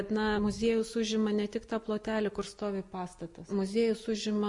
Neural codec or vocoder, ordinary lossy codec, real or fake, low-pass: none; MP3, 48 kbps; real; 10.8 kHz